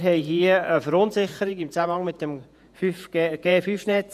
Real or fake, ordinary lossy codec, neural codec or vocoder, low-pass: fake; none; vocoder, 48 kHz, 128 mel bands, Vocos; 14.4 kHz